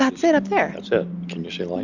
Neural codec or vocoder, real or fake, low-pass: none; real; 7.2 kHz